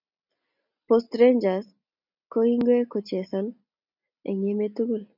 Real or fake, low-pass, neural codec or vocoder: real; 5.4 kHz; none